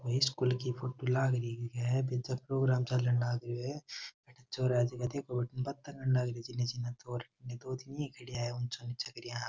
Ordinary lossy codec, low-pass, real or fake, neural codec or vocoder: none; none; real; none